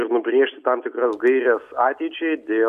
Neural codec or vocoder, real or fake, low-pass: none; real; 14.4 kHz